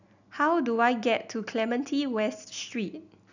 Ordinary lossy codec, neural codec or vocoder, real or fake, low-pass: none; none; real; 7.2 kHz